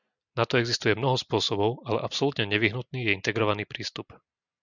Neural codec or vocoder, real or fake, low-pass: none; real; 7.2 kHz